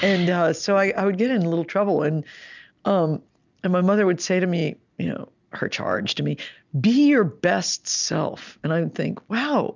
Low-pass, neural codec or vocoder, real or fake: 7.2 kHz; none; real